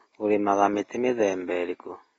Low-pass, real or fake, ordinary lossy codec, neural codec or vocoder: 19.8 kHz; real; AAC, 24 kbps; none